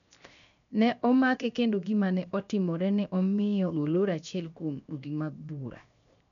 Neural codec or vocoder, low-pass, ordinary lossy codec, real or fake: codec, 16 kHz, 0.7 kbps, FocalCodec; 7.2 kHz; none; fake